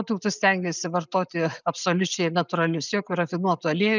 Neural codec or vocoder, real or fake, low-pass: none; real; 7.2 kHz